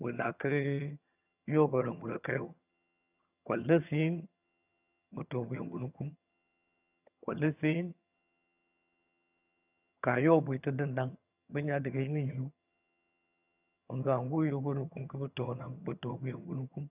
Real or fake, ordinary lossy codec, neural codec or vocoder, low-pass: fake; none; vocoder, 22.05 kHz, 80 mel bands, HiFi-GAN; 3.6 kHz